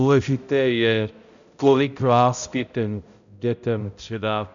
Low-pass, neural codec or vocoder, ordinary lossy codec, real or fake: 7.2 kHz; codec, 16 kHz, 0.5 kbps, X-Codec, HuBERT features, trained on balanced general audio; MP3, 64 kbps; fake